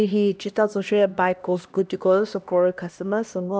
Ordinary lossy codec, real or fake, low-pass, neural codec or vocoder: none; fake; none; codec, 16 kHz, 1 kbps, X-Codec, HuBERT features, trained on LibriSpeech